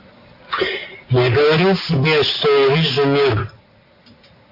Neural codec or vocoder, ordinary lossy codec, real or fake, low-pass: none; AAC, 32 kbps; real; 5.4 kHz